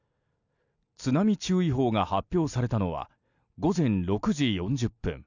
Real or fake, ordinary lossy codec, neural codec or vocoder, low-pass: real; none; none; 7.2 kHz